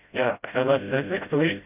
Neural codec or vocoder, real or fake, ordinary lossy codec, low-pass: codec, 16 kHz, 0.5 kbps, FreqCodec, smaller model; fake; none; 3.6 kHz